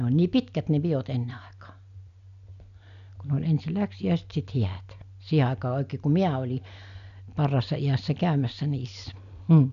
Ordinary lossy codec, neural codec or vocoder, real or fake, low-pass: none; none; real; 7.2 kHz